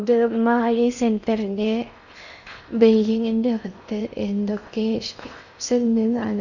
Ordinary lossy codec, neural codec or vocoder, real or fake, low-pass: none; codec, 16 kHz in and 24 kHz out, 0.6 kbps, FocalCodec, streaming, 4096 codes; fake; 7.2 kHz